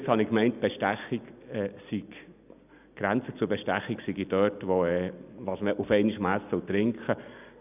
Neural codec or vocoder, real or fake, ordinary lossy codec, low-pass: none; real; none; 3.6 kHz